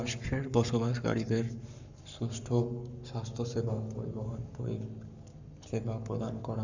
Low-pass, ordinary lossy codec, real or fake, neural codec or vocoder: 7.2 kHz; none; fake; codec, 44.1 kHz, 7.8 kbps, Pupu-Codec